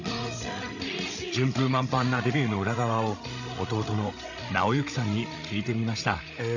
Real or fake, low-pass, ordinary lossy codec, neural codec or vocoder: fake; 7.2 kHz; none; codec, 16 kHz, 16 kbps, FreqCodec, larger model